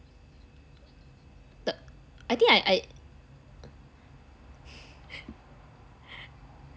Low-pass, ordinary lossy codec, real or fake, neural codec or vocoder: none; none; real; none